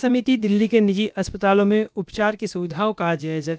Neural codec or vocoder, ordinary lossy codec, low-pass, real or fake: codec, 16 kHz, about 1 kbps, DyCAST, with the encoder's durations; none; none; fake